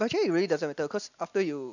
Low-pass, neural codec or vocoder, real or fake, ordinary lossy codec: 7.2 kHz; none; real; none